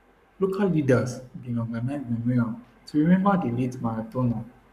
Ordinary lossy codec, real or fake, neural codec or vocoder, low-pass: none; fake; codec, 44.1 kHz, 7.8 kbps, Pupu-Codec; 14.4 kHz